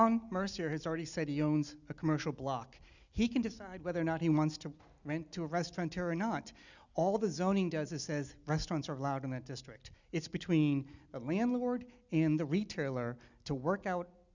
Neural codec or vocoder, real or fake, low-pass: none; real; 7.2 kHz